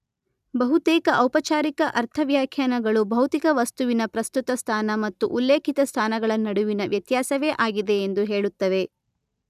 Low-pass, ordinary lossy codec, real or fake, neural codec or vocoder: 14.4 kHz; none; real; none